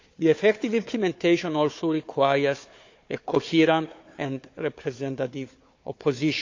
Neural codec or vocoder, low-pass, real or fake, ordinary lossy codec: codec, 16 kHz, 4 kbps, FunCodec, trained on Chinese and English, 50 frames a second; 7.2 kHz; fake; MP3, 48 kbps